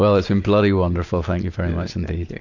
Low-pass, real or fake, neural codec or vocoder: 7.2 kHz; real; none